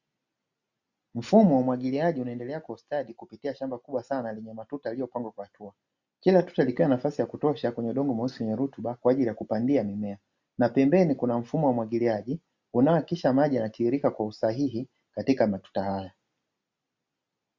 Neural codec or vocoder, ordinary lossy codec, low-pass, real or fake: none; Opus, 64 kbps; 7.2 kHz; real